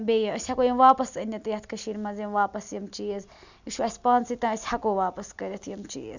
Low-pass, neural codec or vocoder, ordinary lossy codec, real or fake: 7.2 kHz; none; none; real